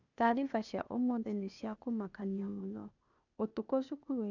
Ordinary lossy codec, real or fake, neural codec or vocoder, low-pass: none; fake; codec, 16 kHz, 0.8 kbps, ZipCodec; 7.2 kHz